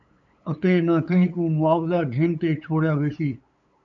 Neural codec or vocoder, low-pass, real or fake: codec, 16 kHz, 8 kbps, FunCodec, trained on LibriTTS, 25 frames a second; 7.2 kHz; fake